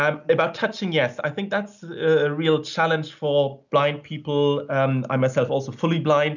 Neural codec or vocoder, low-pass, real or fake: none; 7.2 kHz; real